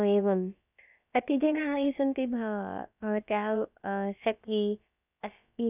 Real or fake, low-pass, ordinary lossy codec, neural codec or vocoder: fake; 3.6 kHz; none; codec, 16 kHz, about 1 kbps, DyCAST, with the encoder's durations